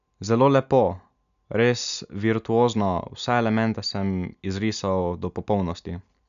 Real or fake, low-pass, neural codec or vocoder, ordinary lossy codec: real; 7.2 kHz; none; none